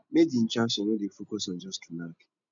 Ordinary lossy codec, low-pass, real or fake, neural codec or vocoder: none; 7.2 kHz; real; none